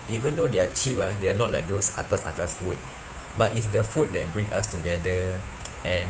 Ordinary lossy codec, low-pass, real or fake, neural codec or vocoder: none; none; fake; codec, 16 kHz, 2 kbps, FunCodec, trained on Chinese and English, 25 frames a second